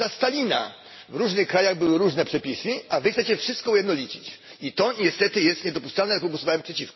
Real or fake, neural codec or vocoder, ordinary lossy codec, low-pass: real; none; MP3, 24 kbps; 7.2 kHz